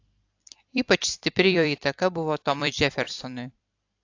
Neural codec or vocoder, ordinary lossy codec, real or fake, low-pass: vocoder, 44.1 kHz, 128 mel bands every 256 samples, BigVGAN v2; AAC, 48 kbps; fake; 7.2 kHz